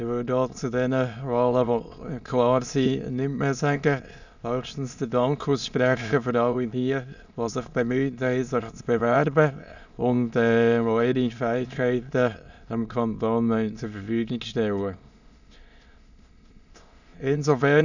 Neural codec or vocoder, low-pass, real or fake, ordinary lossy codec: autoencoder, 22.05 kHz, a latent of 192 numbers a frame, VITS, trained on many speakers; 7.2 kHz; fake; none